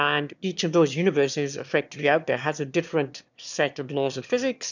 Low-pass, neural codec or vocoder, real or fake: 7.2 kHz; autoencoder, 22.05 kHz, a latent of 192 numbers a frame, VITS, trained on one speaker; fake